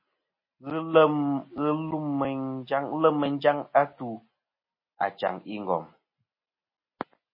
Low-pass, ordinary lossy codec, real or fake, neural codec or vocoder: 5.4 kHz; MP3, 24 kbps; real; none